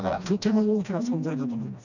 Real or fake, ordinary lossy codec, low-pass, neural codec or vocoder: fake; none; 7.2 kHz; codec, 16 kHz, 1 kbps, FreqCodec, smaller model